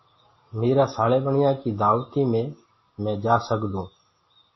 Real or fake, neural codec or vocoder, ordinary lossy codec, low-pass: real; none; MP3, 24 kbps; 7.2 kHz